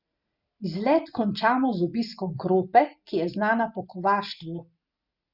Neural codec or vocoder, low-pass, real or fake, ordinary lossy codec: none; 5.4 kHz; real; Opus, 64 kbps